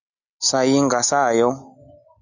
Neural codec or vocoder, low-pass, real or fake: none; 7.2 kHz; real